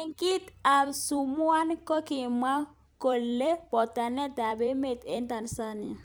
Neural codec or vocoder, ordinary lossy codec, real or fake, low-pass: vocoder, 44.1 kHz, 128 mel bands every 256 samples, BigVGAN v2; none; fake; none